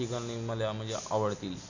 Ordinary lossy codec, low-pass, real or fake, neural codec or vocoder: none; 7.2 kHz; real; none